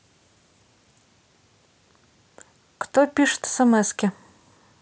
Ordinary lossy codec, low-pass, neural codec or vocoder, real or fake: none; none; none; real